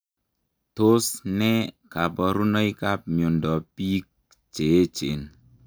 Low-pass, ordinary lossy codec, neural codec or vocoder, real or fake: none; none; none; real